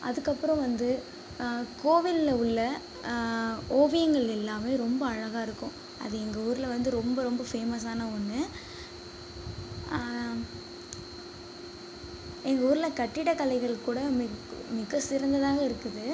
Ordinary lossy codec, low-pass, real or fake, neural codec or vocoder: none; none; real; none